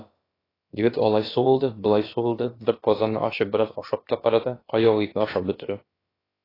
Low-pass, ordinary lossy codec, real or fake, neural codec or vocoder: 5.4 kHz; AAC, 24 kbps; fake; codec, 16 kHz, about 1 kbps, DyCAST, with the encoder's durations